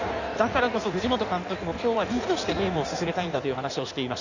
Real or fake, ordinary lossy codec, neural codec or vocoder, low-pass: fake; none; codec, 16 kHz in and 24 kHz out, 1.1 kbps, FireRedTTS-2 codec; 7.2 kHz